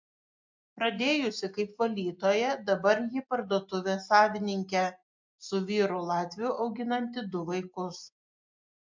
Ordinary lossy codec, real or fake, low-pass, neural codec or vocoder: MP3, 48 kbps; real; 7.2 kHz; none